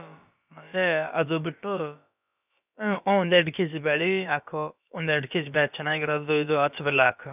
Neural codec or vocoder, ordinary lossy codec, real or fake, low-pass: codec, 16 kHz, about 1 kbps, DyCAST, with the encoder's durations; none; fake; 3.6 kHz